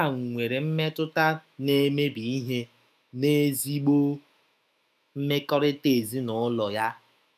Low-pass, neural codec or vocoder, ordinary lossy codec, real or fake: 14.4 kHz; autoencoder, 48 kHz, 128 numbers a frame, DAC-VAE, trained on Japanese speech; none; fake